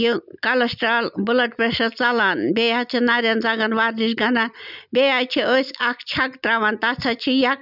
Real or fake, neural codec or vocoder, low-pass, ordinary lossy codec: real; none; 5.4 kHz; none